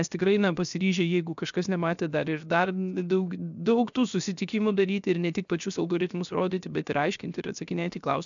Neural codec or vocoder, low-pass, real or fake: codec, 16 kHz, 0.7 kbps, FocalCodec; 7.2 kHz; fake